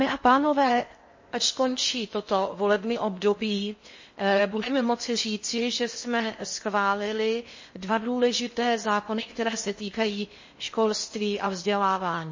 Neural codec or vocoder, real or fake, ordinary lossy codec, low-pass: codec, 16 kHz in and 24 kHz out, 0.6 kbps, FocalCodec, streaming, 4096 codes; fake; MP3, 32 kbps; 7.2 kHz